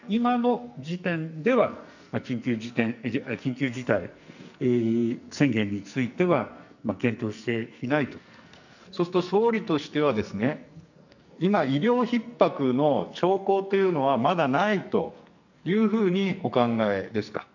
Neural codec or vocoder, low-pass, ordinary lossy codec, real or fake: codec, 44.1 kHz, 2.6 kbps, SNAC; 7.2 kHz; none; fake